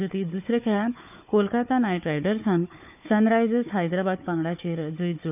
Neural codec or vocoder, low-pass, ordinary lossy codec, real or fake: codec, 16 kHz, 4 kbps, FunCodec, trained on Chinese and English, 50 frames a second; 3.6 kHz; none; fake